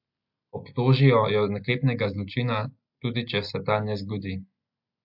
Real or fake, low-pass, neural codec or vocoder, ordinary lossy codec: real; 5.4 kHz; none; MP3, 48 kbps